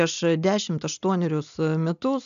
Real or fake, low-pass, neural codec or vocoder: real; 7.2 kHz; none